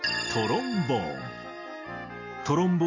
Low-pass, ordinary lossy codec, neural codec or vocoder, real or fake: 7.2 kHz; MP3, 48 kbps; none; real